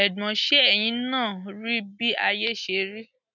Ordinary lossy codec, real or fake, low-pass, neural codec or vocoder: none; real; 7.2 kHz; none